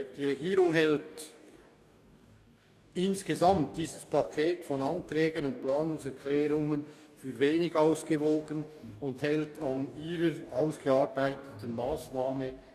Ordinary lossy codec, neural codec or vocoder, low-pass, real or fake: none; codec, 44.1 kHz, 2.6 kbps, DAC; 14.4 kHz; fake